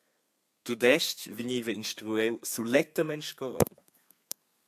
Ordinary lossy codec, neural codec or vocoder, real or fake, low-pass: AAC, 96 kbps; codec, 32 kHz, 1.9 kbps, SNAC; fake; 14.4 kHz